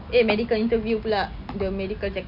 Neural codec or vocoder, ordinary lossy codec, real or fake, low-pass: none; none; real; 5.4 kHz